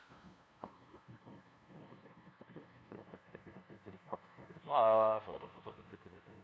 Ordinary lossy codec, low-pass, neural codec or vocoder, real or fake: none; none; codec, 16 kHz, 0.5 kbps, FunCodec, trained on LibriTTS, 25 frames a second; fake